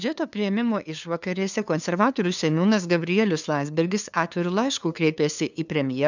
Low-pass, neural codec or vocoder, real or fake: 7.2 kHz; codec, 16 kHz, 2 kbps, FunCodec, trained on LibriTTS, 25 frames a second; fake